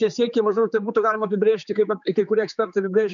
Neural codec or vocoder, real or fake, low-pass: codec, 16 kHz, 4 kbps, X-Codec, HuBERT features, trained on general audio; fake; 7.2 kHz